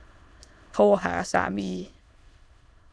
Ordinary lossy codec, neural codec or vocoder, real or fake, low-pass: none; autoencoder, 22.05 kHz, a latent of 192 numbers a frame, VITS, trained on many speakers; fake; none